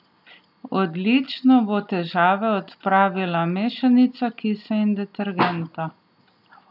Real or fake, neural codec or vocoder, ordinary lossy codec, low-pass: real; none; none; 5.4 kHz